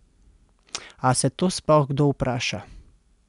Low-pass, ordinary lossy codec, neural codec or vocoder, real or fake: 10.8 kHz; none; none; real